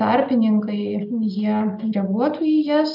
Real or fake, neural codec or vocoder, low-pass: real; none; 5.4 kHz